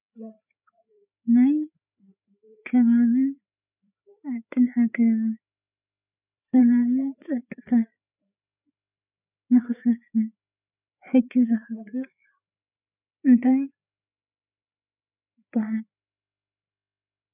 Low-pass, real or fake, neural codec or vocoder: 3.6 kHz; fake; codec, 16 kHz, 4 kbps, FreqCodec, larger model